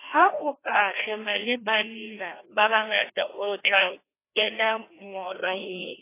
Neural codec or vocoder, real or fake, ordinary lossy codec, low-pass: codec, 16 kHz, 1 kbps, FreqCodec, larger model; fake; AAC, 24 kbps; 3.6 kHz